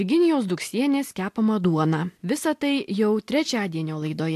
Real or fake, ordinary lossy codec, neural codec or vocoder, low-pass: real; AAC, 64 kbps; none; 14.4 kHz